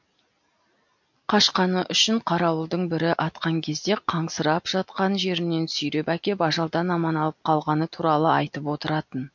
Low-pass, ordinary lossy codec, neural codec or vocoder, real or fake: 7.2 kHz; MP3, 48 kbps; none; real